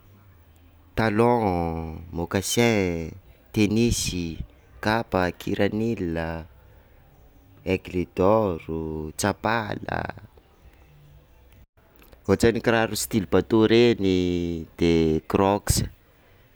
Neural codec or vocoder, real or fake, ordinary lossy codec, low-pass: none; real; none; none